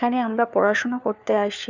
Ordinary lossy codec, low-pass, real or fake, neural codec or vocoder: none; 7.2 kHz; fake; codec, 16 kHz, 4 kbps, FunCodec, trained on LibriTTS, 50 frames a second